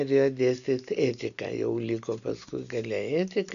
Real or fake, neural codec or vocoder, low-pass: real; none; 7.2 kHz